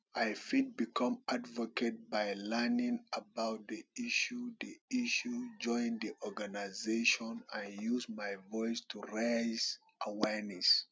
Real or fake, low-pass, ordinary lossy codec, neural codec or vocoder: real; none; none; none